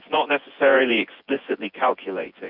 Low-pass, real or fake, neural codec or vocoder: 5.4 kHz; fake; vocoder, 24 kHz, 100 mel bands, Vocos